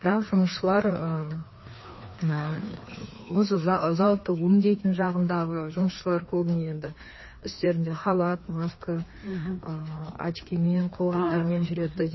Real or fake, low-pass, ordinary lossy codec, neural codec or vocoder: fake; 7.2 kHz; MP3, 24 kbps; codec, 16 kHz, 2 kbps, FreqCodec, larger model